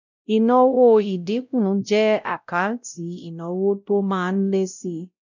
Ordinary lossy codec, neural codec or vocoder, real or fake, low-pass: none; codec, 16 kHz, 0.5 kbps, X-Codec, WavLM features, trained on Multilingual LibriSpeech; fake; 7.2 kHz